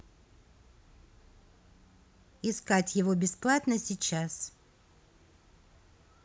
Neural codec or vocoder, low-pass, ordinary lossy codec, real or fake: none; none; none; real